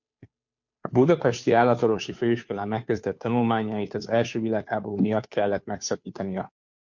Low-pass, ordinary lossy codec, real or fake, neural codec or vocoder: 7.2 kHz; MP3, 48 kbps; fake; codec, 16 kHz, 2 kbps, FunCodec, trained on Chinese and English, 25 frames a second